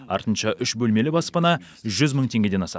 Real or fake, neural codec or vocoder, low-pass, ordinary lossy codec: real; none; none; none